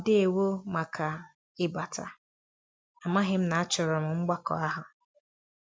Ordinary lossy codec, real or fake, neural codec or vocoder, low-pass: none; real; none; none